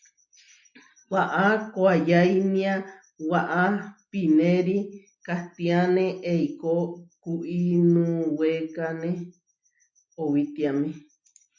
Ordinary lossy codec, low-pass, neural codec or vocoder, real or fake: MP3, 64 kbps; 7.2 kHz; none; real